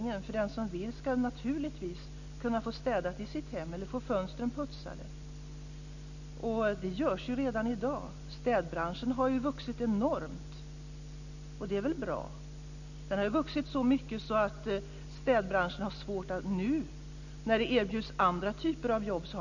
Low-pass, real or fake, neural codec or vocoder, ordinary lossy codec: 7.2 kHz; real; none; none